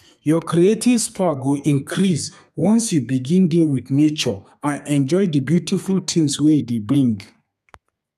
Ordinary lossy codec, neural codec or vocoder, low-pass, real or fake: none; codec, 32 kHz, 1.9 kbps, SNAC; 14.4 kHz; fake